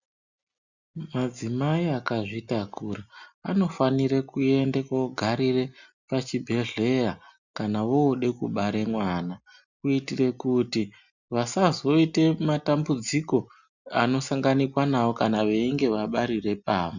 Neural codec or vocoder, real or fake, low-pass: none; real; 7.2 kHz